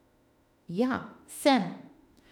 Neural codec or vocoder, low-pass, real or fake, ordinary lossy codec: autoencoder, 48 kHz, 32 numbers a frame, DAC-VAE, trained on Japanese speech; 19.8 kHz; fake; none